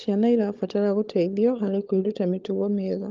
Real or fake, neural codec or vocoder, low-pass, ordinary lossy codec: fake; codec, 16 kHz, 16 kbps, FunCodec, trained on LibriTTS, 50 frames a second; 7.2 kHz; Opus, 32 kbps